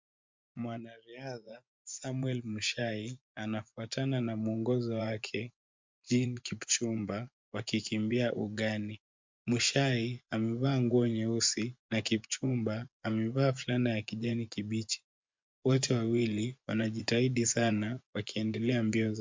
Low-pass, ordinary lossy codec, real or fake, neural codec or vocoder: 7.2 kHz; MP3, 64 kbps; fake; vocoder, 24 kHz, 100 mel bands, Vocos